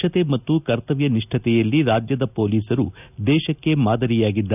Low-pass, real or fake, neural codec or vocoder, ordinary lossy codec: 3.6 kHz; real; none; none